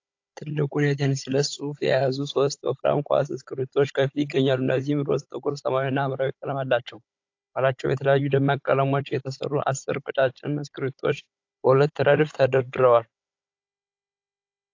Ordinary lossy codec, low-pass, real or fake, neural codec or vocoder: AAC, 48 kbps; 7.2 kHz; fake; codec, 16 kHz, 16 kbps, FunCodec, trained on Chinese and English, 50 frames a second